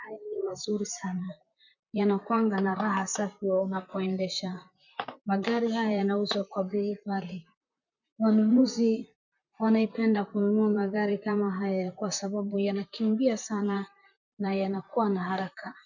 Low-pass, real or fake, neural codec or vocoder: 7.2 kHz; fake; vocoder, 44.1 kHz, 128 mel bands, Pupu-Vocoder